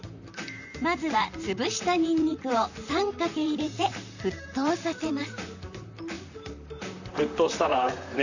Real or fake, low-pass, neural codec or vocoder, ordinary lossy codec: fake; 7.2 kHz; vocoder, 44.1 kHz, 128 mel bands, Pupu-Vocoder; none